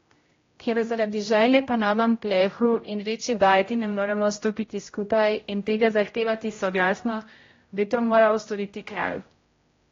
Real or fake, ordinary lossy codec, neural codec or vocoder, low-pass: fake; AAC, 32 kbps; codec, 16 kHz, 0.5 kbps, X-Codec, HuBERT features, trained on general audio; 7.2 kHz